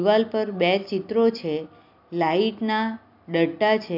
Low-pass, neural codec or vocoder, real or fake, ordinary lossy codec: 5.4 kHz; none; real; none